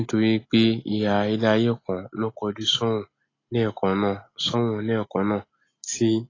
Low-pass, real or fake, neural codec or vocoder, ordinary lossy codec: 7.2 kHz; real; none; AAC, 32 kbps